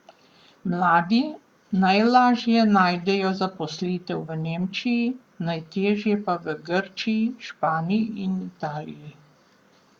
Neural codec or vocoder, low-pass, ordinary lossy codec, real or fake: codec, 44.1 kHz, 7.8 kbps, Pupu-Codec; 19.8 kHz; Opus, 64 kbps; fake